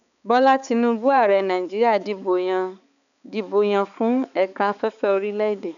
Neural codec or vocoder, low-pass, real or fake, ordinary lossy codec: codec, 16 kHz, 4 kbps, X-Codec, HuBERT features, trained on balanced general audio; 7.2 kHz; fake; none